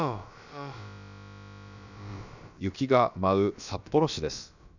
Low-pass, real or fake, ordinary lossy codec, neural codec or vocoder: 7.2 kHz; fake; none; codec, 16 kHz, about 1 kbps, DyCAST, with the encoder's durations